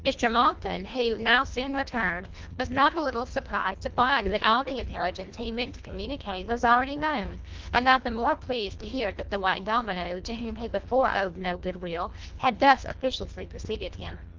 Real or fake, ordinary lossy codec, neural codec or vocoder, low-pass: fake; Opus, 32 kbps; codec, 24 kHz, 1.5 kbps, HILCodec; 7.2 kHz